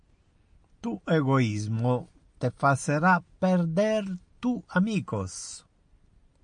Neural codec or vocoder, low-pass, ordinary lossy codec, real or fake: none; 9.9 kHz; MP3, 64 kbps; real